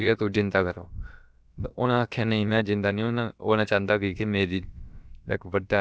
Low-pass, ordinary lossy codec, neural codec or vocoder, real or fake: none; none; codec, 16 kHz, about 1 kbps, DyCAST, with the encoder's durations; fake